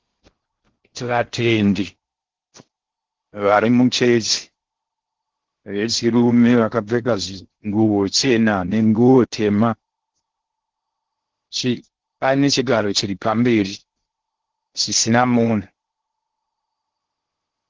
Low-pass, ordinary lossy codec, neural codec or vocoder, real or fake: 7.2 kHz; Opus, 16 kbps; codec, 16 kHz in and 24 kHz out, 0.6 kbps, FocalCodec, streaming, 4096 codes; fake